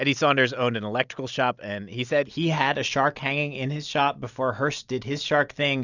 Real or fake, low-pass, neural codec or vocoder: real; 7.2 kHz; none